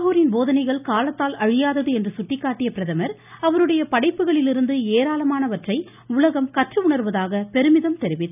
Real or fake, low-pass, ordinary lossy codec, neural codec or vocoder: real; 3.6 kHz; none; none